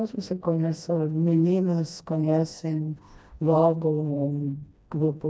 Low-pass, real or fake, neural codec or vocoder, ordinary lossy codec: none; fake; codec, 16 kHz, 1 kbps, FreqCodec, smaller model; none